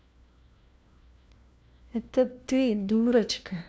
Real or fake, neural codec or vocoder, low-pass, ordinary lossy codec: fake; codec, 16 kHz, 1 kbps, FunCodec, trained on LibriTTS, 50 frames a second; none; none